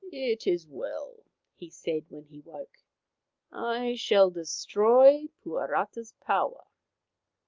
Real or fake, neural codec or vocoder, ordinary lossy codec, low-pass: real; none; Opus, 24 kbps; 7.2 kHz